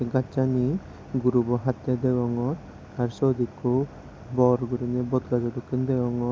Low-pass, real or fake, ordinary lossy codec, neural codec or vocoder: none; real; none; none